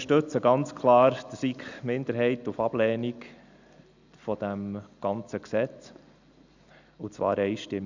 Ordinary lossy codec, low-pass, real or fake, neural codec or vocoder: none; 7.2 kHz; real; none